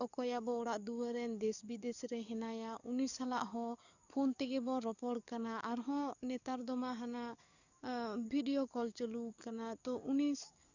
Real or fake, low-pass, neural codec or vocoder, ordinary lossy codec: fake; 7.2 kHz; codec, 44.1 kHz, 7.8 kbps, DAC; none